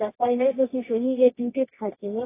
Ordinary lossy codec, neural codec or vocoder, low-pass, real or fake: AAC, 16 kbps; codec, 24 kHz, 0.9 kbps, WavTokenizer, medium music audio release; 3.6 kHz; fake